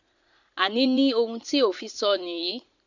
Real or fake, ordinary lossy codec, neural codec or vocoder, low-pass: fake; Opus, 64 kbps; vocoder, 44.1 kHz, 80 mel bands, Vocos; 7.2 kHz